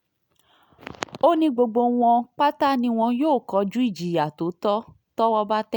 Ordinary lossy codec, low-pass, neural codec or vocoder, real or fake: none; none; none; real